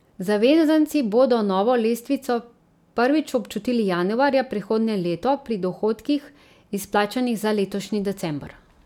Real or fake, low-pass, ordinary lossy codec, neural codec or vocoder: real; 19.8 kHz; none; none